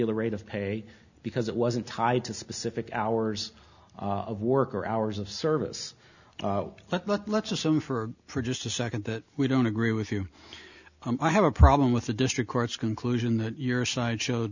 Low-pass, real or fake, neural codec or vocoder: 7.2 kHz; real; none